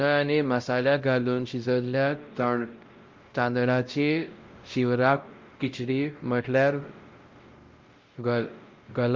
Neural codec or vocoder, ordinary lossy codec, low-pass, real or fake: codec, 16 kHz, 0.5 kbps, X-Codec, WavLM features, trained on Multilingual LibriSpeech; Opus, 32 kbps; 7.2 kHz; fake